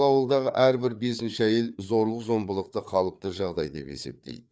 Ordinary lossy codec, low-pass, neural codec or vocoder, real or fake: none; none; codec, 16 kHz, 4 kbps, FreqCodec, larger model; fake